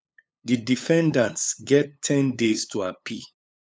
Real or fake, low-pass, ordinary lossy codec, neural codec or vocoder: fake; none; none; codec, 16 kHz, 8 kbps, FunCodec, trained on LibriTTS, 25 frames a second